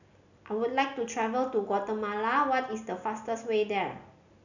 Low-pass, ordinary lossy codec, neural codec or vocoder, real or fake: 7.2 kHz; none; none; real